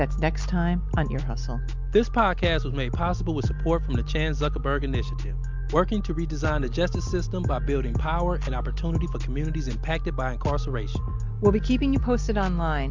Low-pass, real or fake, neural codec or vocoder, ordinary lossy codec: 7.2 kHz; real; none; MP3, 64 kbps